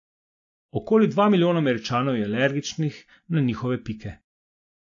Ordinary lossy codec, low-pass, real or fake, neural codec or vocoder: AAC, 48 kbps; 7.2 kHz; real; none